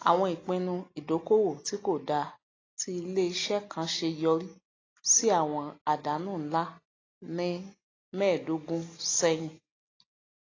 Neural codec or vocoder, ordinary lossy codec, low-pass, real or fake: none; AAC, 32 kbps; 7.2 kHz; real